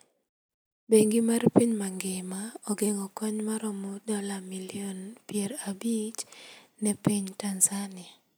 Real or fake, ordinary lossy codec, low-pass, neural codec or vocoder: real; none; none; none